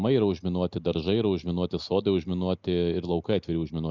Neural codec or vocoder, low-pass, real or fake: none; 7.2 kHz; real